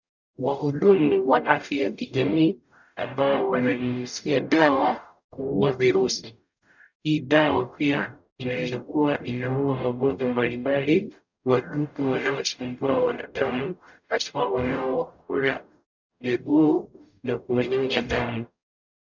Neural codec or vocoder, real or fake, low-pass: codec, 44.1 kHz, 0.9 kbps, DAC; fake; 7.2 kHz